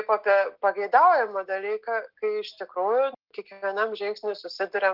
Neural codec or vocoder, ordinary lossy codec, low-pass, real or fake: none; Opus, 24 kbps; 5.4 kHz; real